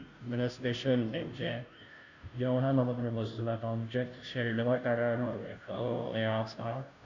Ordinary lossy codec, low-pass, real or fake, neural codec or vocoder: none; 7.2 kHz; fake; codec, 16 kHz, 0.5 kbps, FunCodec, trained on Chinese and English, 25 frames a second